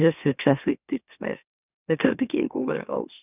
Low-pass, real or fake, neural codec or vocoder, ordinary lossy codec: 3.6 kHz; fake; autoencoder, 44.1 kHz, a latent of 192 numbers a frame, MeloTTS; none